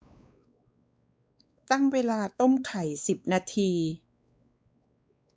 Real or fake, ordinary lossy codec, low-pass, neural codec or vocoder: fake; none; none; codec, 16 kHz, 4 kbps, X-Codec, WavLM features, trained on Multilingual LibriSpeech